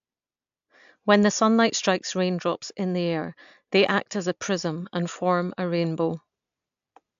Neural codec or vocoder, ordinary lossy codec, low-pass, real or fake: none; AAC, 64 kbps; 7.2 kHz; real